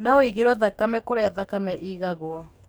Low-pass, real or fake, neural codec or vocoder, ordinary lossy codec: none; fake; codec, 44.1 kHz, 2.6 kbps, DAC; none